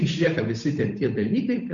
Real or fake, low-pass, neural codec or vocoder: fake; 7.2 kHz; codec, 16 kHz, 8 kbps, FunCodec, trained on Chinese and English, 25 frames a second